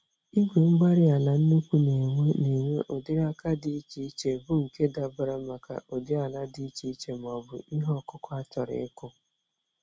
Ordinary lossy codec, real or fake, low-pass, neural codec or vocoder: none; real; none; none